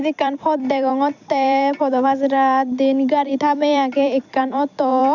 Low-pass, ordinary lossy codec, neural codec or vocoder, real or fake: 7.2 kHz; none; vocoder, 44.1 kHz, 128 mel bands every 256 samples, BigVGAN v2; fake